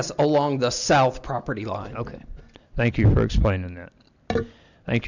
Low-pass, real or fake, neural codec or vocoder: 7.2 kHz; real; none